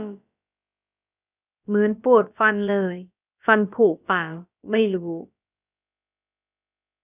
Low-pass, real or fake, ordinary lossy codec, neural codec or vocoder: 3.6 kHz; fake; none; codec, 16 kHz, about 1 kbps, DyCAST, with the encoder's durations